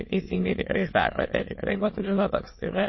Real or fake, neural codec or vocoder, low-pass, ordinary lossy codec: fake; autoencoder, 22.05 kHz, a latent of 192 numbers a frame, VITS, trained on many speakers; 7.2 kHz; MP3, 24 kbps